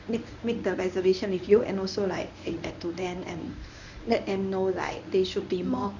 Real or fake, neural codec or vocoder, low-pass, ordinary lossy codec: fake; codec, 24 kHz, 0.9 kbps, WavTokenizer, medium speech release version 1; 7.2 kHz; none